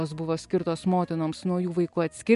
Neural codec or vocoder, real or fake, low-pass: none; real; 10.8 kHz